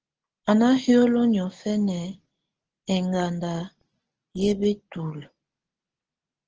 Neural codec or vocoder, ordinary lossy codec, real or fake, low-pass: none; Opus, 16 kbps; real; 7.2 kHz